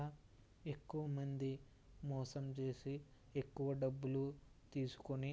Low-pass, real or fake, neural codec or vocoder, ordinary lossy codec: none; real; none; none